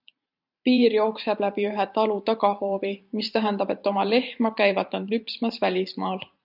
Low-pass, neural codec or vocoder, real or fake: 5.4 kHz; vocoder, 44.1 kHz, 128 mel bands every 512 samples, BigVGAN v2; fake